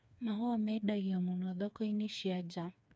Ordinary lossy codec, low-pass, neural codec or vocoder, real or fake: none; none; codec, 16 kHz, 4 kbps, FreqCodec, smaller model; fake